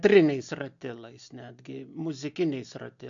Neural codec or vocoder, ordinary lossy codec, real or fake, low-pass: none; AAC, 64 kbps; real; 7.2 kHz